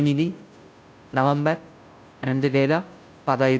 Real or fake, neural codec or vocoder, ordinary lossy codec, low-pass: fake; codec, 16 kHz, 0.5 kbps, FunCodec, trained on Chinese and English, 25 frames a second; none; none